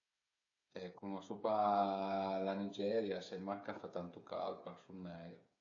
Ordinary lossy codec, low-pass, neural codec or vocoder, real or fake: AAC, 48 kbps; 7.2 kHz; codec, 16 kHz, 8 kbps, FreqCodec, smaller model; fake